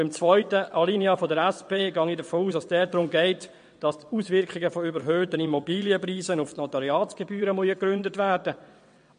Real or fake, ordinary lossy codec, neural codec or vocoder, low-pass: fake; MP3, 48 kbps; vocoder, 22.05 kHz, 80 mel bands, WaveNeXt; 9.9 kHz